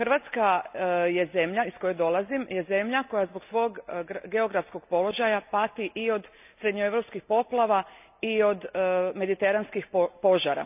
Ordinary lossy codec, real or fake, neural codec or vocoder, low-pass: none; real; none; 3.6 kHz